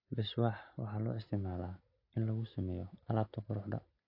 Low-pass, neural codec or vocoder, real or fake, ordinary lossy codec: 5.4 kHz; none; real; AAC, 32 kbps